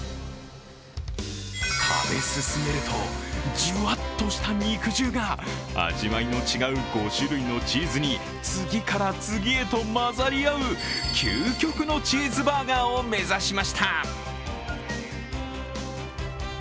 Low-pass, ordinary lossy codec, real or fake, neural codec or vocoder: none; none; real; none